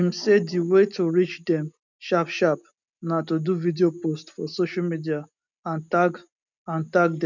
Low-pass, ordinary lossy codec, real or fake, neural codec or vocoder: 7.2 kHz; none; real; none